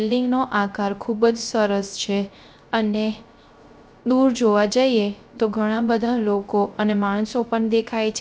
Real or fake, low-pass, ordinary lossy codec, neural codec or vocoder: fake; none; none; codec, 16 kHz, 0.3 kbps, FocalCodec